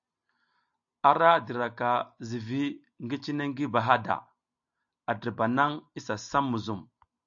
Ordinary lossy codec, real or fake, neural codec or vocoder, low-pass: AAC, 64 kbps; real; none; 7.2 kHz